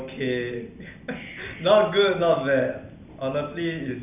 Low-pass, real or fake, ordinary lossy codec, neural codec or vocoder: 3.6 kHz; real; none; none